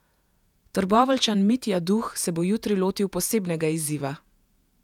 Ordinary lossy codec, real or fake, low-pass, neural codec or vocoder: none; fake; 19.8 kHz; vocoder, 48 kHz, 128 mel bands, Vocos